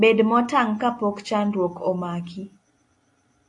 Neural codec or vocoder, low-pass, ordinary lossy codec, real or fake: none; 10.8 kHz; AAC, 48 kbps; real